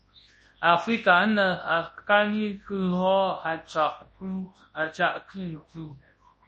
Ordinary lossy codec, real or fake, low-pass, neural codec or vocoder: MP3, 32 kbps; fake; 10.8 kHz; codec, 24 kHz, 0.9 kbps, WavTokenizer, large speech release